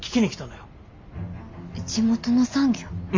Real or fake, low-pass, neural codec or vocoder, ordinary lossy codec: real; 7.2 kHz; none; MP3, 64 kbps